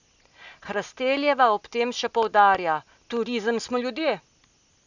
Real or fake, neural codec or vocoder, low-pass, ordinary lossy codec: real; none; 7.2 kHz; none